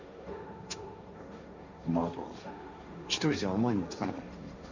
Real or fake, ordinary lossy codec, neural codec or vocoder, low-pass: fake; Opus, 64 kbps; codec, 16 kHz, 1.1 kbps, Voila-Tokenizer; 7.2 kHz